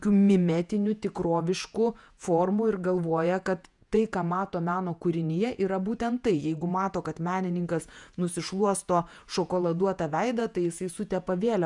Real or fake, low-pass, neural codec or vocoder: fake; 10.8 kHz; vocoder, 48 kHz, 128 mel bands, Vocos